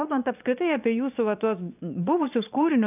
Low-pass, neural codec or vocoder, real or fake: 3.6 kHz; vocoder, 22.05 kHz, 80 mel bands, Vocos; fake